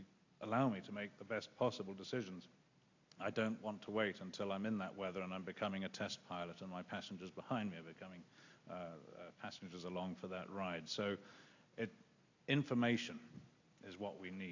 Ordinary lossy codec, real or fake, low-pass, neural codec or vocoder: AAC, 48 kbps; real; 7.2 kHz; none